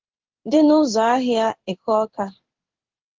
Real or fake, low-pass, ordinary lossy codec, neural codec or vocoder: fake; 7.2 kHz; Opus, 16 kbps; codec, 16 kHz in and 24 kHz out, 1 kbps, XY-Tokenizer